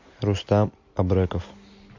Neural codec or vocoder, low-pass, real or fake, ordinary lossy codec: none; 7.2 kHz; real; MP3, 48 kbps